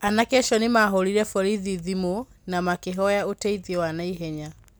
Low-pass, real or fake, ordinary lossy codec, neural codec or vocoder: none; real; none; none